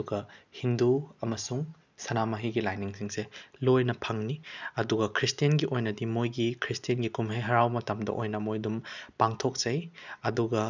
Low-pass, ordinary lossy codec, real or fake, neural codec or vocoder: 7.2 kHz; none; real; none